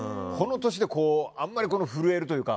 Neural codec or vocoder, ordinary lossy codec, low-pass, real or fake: none; none; none; real